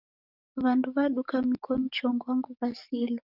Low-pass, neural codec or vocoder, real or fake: 5.4 kHz; codec, 44.1 kHz, 7.8 kbps, DAC; fake